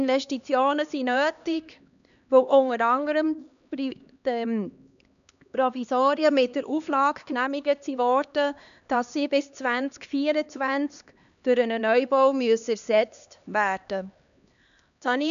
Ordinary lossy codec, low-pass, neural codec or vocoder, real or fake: none; 7.2 kHz; codec, 16 kHz, 2 kbps, X-Codec, HuBERT features, trained on LibriSpeech; fake